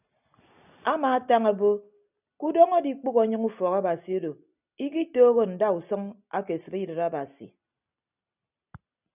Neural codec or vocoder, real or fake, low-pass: none; real; 3.6 kHz